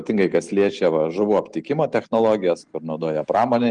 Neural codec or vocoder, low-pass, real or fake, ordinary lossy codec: none; 9.9 kHz; real; Opus, 24 kbps